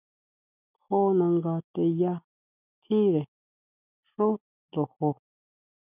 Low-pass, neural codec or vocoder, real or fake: 3.6 kHz; none; real